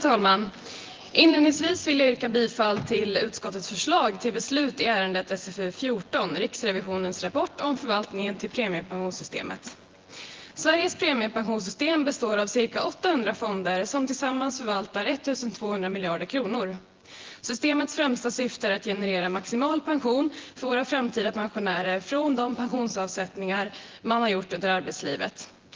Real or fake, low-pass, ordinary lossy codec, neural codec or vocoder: fake; 7.2 kHz; Opus, 16 kbps; vocoder, 24 kHz, 100 mel bands, Vocos